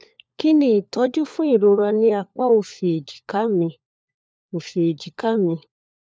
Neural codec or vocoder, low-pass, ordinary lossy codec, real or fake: codec, 16 kHz, 4 kbps, FunCodec, trained on LibriTTS, 50 frames a second; none; none; fake